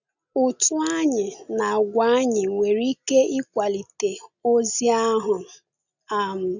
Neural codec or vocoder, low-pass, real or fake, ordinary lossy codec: none; 7.2 kHz; real; none